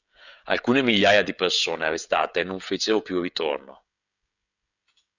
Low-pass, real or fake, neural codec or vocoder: 7.2 kHz; fake; codec, 16 kHz, 16 kbps, FreqCodec, smaller model